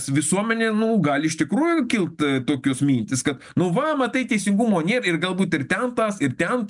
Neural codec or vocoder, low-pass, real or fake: none; 10.8 kHz; real